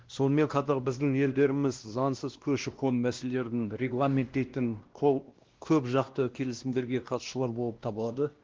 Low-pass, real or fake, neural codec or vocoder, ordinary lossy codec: 7.2 kHz; fake; codec, 16 kHz, 1 kbps, X-Codec, WavLM features, trained on Multilingual LibriSpeech; Opus, 16 kbps